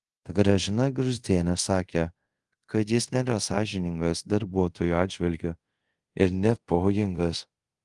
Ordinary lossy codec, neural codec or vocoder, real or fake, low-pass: Opus, 24 kbps; codec, 24 kHz, 0.5 kbps, DualCodec; fake; 10.8 kHz